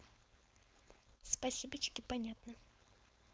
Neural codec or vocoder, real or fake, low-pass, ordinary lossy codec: codec, 16 kHz, 4.8 kbps, FACodec; fake; none; none